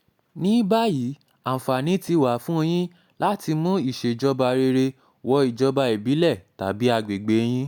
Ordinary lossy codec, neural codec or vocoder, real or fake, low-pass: none; none; real; none